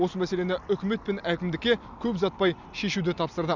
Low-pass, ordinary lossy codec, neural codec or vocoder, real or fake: 7.2 kHz; none; none; real